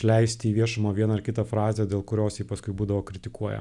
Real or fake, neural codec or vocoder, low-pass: real; none; 10.8 kHz